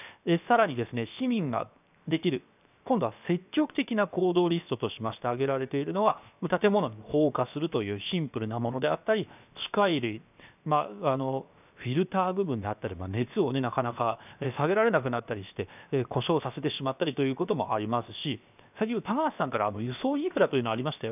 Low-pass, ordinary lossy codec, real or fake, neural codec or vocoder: 3.6 kHz; none; fake; codec, 16 kHz, 0.7 kbps, FocalCodec